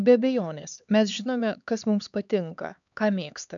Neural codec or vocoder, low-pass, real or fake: codec, 16 kHz, 4 kbps, X-Codec, HuBERT features, trained on LibriSpeech; 7.2 kHz; fake